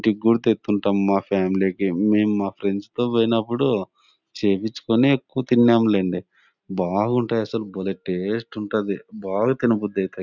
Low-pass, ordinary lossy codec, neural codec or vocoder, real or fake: 7.2 kHz; none; none; real